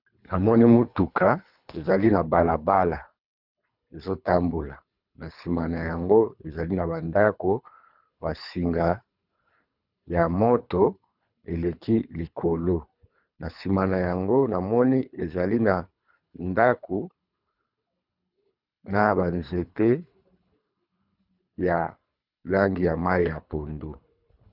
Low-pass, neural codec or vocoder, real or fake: 5.4 kHz; codec, 24 kHz, 3 kbps, HILCodec; fake